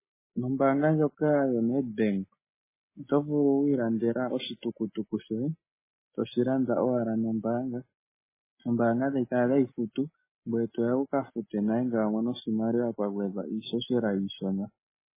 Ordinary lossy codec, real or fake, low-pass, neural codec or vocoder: MP3, 16 kbps; real; 3.6 kHz; none